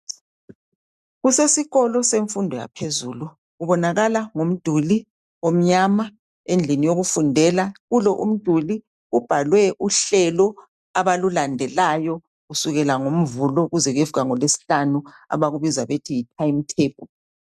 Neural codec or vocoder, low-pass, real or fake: none; 14.4 kHz; real